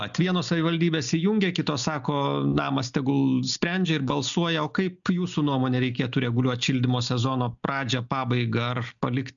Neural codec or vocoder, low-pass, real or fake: none; 7.2 kHz; real